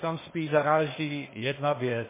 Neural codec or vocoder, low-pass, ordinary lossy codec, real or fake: codec, 16 kHz, 0.8 kbps, ZipCodec; 3.6 kHz; MP3, 16 kbps; fake